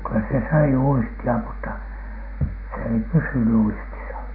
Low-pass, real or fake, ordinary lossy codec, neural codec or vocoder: 5.4 kHz; real; none; none